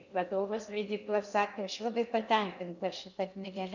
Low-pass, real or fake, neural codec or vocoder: 7.2 kHz; fake; codec, 16 kHz in and 24 kHz out, 0.6 kbps, FocalCodec, streaming, 2048 codes